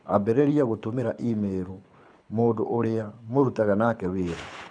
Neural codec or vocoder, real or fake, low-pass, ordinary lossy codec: codec, 24 kHz, 6 kbps, HILCodec; fake; 9.9 kHz; none